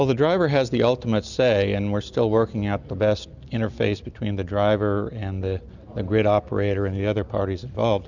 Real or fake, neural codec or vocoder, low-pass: real; none; 7.2 kHz